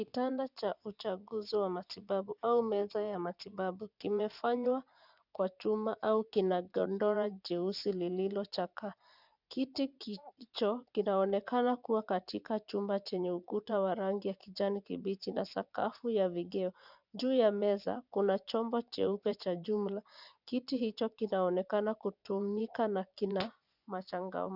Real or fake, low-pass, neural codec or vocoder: fake; 5.4 kHz; vocoder, 24 kHz, 100 mel bands, Vocos